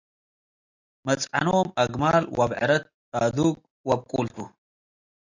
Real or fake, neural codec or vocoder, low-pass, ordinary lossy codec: real; none; 7.2 kHz; AAC, 48 kbps